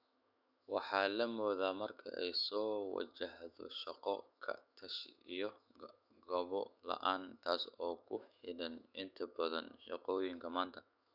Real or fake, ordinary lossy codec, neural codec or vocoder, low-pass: fake; none; autoencoder, 48 kHz, 128 numbers a frame, DAC-VAE, trained on Japanese speech; 5.4 kHz